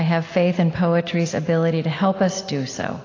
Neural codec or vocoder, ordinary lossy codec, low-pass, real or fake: none; AAC, 32 kbps; 7.2 kHz; real